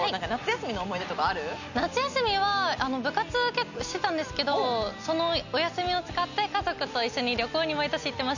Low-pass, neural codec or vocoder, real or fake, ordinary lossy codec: 7.2 kHz; none; real; none